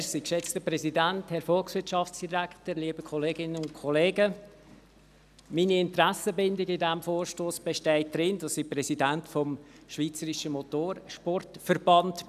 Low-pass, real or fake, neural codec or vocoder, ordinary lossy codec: 14.4 kHz; real; none; none